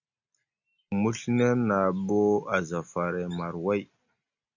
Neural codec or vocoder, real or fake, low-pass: none; real; 7.2 kHz